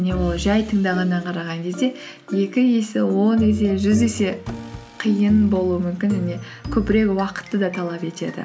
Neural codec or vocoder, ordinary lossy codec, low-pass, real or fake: none; none; none; real